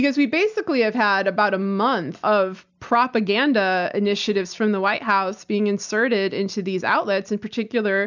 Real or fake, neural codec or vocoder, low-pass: real; none; 7.2 kHz